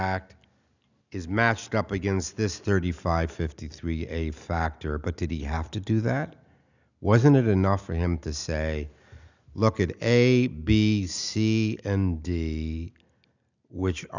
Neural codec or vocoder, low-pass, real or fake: none; 7.2 kHz; real